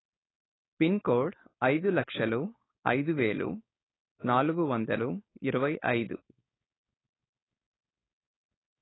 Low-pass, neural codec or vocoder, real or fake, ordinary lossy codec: 7.2 kHz; codec, 16 kHz, 4.8 kbps, FACodec; fake; AAC, 16 kbps